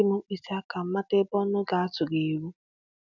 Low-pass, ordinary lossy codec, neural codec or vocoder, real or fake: 7.2 kHz; none; none; real